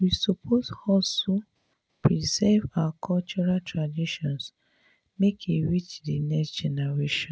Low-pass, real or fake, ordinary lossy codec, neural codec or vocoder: none; real; none; none